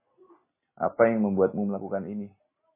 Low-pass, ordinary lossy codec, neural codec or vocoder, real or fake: 3.6 kHz; MP3, 16 kbps; none; real